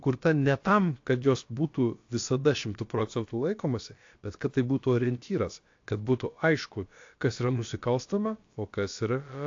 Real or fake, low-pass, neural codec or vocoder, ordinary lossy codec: fake; 7.2 kHz; codec, 16 kHz, about 1 kbps, DyCAST, with the encoder's durations; AAC, 48 kbps